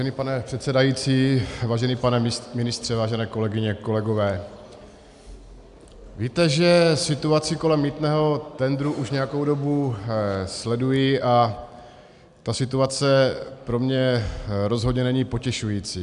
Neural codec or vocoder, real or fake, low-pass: none; real; 10.8 kHz